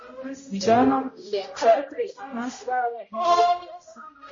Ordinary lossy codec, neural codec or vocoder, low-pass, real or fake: MP3, 32 kbps; codec, 16 kHz, 0.5 kbps, X-Codec, HuBERT features, trained on general audio; 7.2 kHz; fake